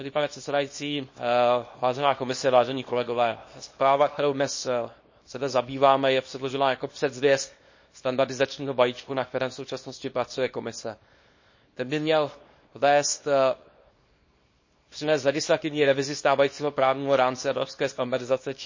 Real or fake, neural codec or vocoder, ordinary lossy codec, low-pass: fake; codec, 24 kHz, 0.9 kbps, WavTokenizer, small release; MP3, 32 kbps; 7.2 kHz